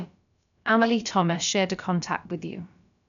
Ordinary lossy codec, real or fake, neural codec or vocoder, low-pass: none; fake; codec, 16 kHz, about 1 kbps, DyCAST, with the encoder's durations; 7.2 kHz